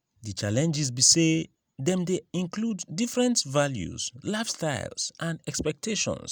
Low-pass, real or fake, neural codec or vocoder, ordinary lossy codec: none; real; none; none